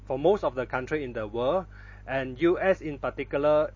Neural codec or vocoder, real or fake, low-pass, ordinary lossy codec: none; real; 7.2 kHz; MP3, 32 kbps